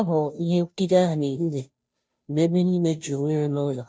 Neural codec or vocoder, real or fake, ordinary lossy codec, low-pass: codec, 16 kHz, 0.5 kbps, FunCodec, trained on Chinese and English, 25 frames a second; fake; none; none